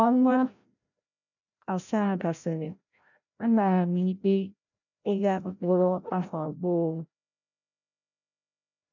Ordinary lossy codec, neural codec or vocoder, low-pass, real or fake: none; codec, 16 kHz, 0.5 kbps, FreqCodec, larger model; 7.2 kHz; fake